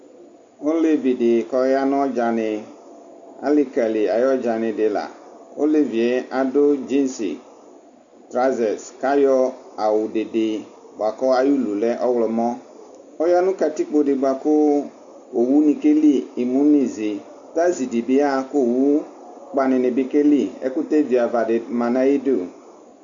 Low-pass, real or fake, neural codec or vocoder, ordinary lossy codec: 7.2 kHz; real; none; MP3, 64 kbps